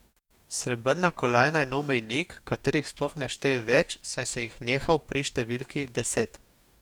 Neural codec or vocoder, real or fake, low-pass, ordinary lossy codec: codec, 44.1 kHz, 2.6 kbps, DAC; fake; 19.8 kHz; none